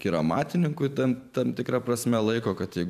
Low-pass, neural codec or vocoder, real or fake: 14.4 kHz; vocoder, 44.1 kHz, 128 mel bands every 512 samples, BigVGAN v2; fake